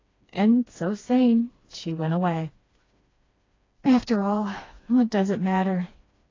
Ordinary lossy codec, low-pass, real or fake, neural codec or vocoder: AAC, 32 kbps; 7.2 kHz; fake; codec, 16 kHz, 2 kbps, FreqCodec, smaller model